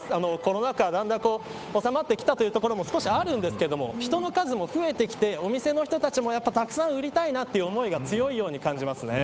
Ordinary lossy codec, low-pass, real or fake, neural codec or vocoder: none; none; fake; codec, 16 kHz, 8 kbps, FunCodec, trained on Chinese and English, 25 frames a second